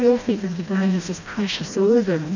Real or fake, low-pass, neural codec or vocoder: fake; 7.2 kHz; codec, 16 kHz, 1 kbps, FreqCodec, smaller model